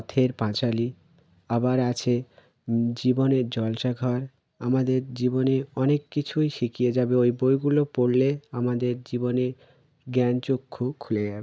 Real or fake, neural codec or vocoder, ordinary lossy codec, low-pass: real; none; none; none